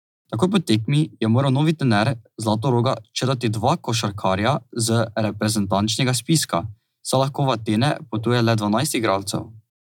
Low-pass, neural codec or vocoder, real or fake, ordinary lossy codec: 19.8 kHz; none; real; none